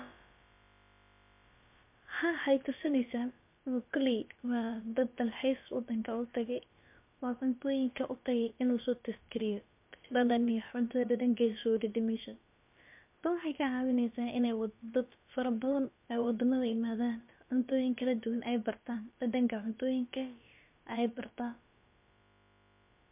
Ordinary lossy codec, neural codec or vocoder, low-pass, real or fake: MP3, 32 kbps; codec, 16 kHz, about 1 kbps, DyCAST, with the encoder's durations; 3.6 kHz; fake